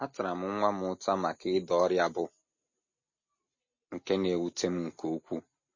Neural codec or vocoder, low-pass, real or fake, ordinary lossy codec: none; 7.2 kHz; real; MP3, 32 kbps